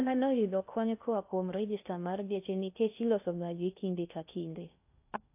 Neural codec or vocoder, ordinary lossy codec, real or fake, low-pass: codec, 16 kHz in and 24 kHz out, 0.6 kbps, FocalCodec, streaming, 2048 codes; AAC, 32 kbps; fake; 3.6 kHz